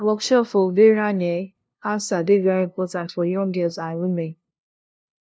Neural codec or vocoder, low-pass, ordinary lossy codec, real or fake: codec, 16 kHz, 0.5 kbps, FunCodec, trained on LibriTTS, 25 frames a second; none; none; fake